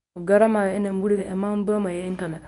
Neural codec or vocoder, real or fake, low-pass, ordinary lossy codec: codec, 24 kHz, 0.9 kbps, WavTokenizer, medium speech release version 1; fake; 10.8 kHz; none